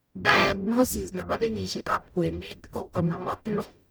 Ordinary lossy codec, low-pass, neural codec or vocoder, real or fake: none; none; codec, 44.1 kHz, 0.9 kbps, DAC; fake